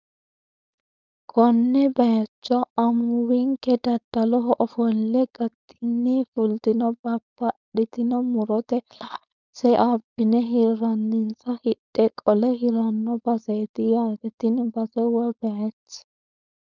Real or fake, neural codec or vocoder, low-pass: fake; codec, 16 kHz, 4.8 kbps, FACodec; 7.2 kHz